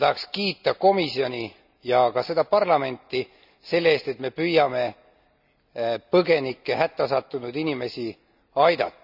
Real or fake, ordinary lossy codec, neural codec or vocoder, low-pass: real; none; none; 5.4 kHz